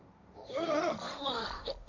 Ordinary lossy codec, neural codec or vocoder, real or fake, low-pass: none; codec, 16 kHz, 1.1 kbps, Voila-Tokenizer; fake; 7.2 kHz